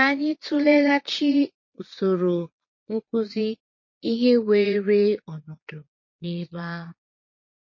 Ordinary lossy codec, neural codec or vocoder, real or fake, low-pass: MP3, 32 kbps; vocoder, 22.05 kHz, 80 mel bands, Vocos; fake; 7.2 kHz